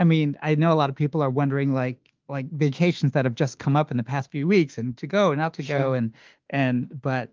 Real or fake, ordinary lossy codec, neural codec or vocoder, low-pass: fake; Opus, 32 kbps; autoencoder, 48 kHz, 32 numbers a frame, DAC-VAE, trained on Japanese speech; 7.2 kHz